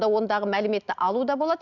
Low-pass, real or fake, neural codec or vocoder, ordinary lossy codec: 7.2 kHz; real; none; Opus, 64 kbps